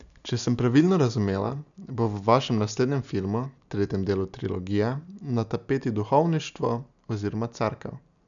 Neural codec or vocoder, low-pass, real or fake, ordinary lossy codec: none; 7.2 kHz; real; none